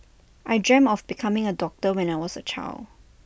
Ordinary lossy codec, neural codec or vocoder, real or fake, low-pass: none; none; real; none